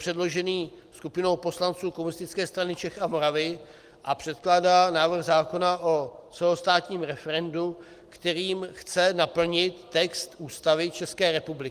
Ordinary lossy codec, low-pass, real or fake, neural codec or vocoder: Opus, 24 kbps; 14.4 kHz; real; none